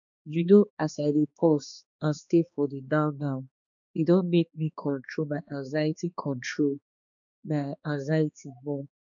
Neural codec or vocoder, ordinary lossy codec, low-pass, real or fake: codec, 16 kHz, 2 kbps, X-Codec, HuBERT features, trained on balanced general audio; none; 7.2 kHz; fake